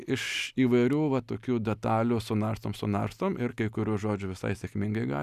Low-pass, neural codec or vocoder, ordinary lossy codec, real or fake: 14.4 kHz; none; Opus, 64 kbps; real